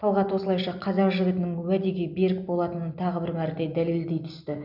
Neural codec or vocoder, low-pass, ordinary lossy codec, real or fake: none; 5.4 kHz; none; real